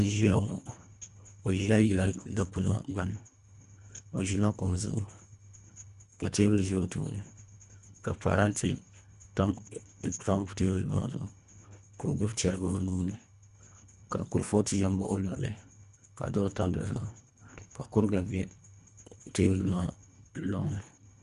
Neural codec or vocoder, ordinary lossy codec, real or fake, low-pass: codec, 24 kHz, 1.5 kbps, HILCodec; Opus, 64 kbps; fake; 10.8 kHz